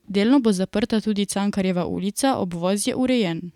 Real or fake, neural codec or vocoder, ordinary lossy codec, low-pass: fake; vocoder, 44.1 kHz, 128 mel bands every 256 samples, BigVGAN v2; none; 19.8 kHz